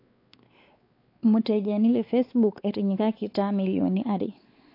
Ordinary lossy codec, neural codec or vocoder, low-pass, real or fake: AAC, 32 kbps; codec, 16 kHz, 4 kbps, X-Codec, WavLM features, trained on Multilingual LibriSpeech; 5.4 kHz; fake